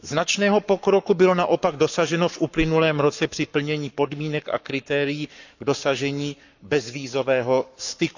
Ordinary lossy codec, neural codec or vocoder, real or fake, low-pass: none; codec, 44.1 kHz, 7.8 kbps, Pupu-Codec; fake; 7.2 kHz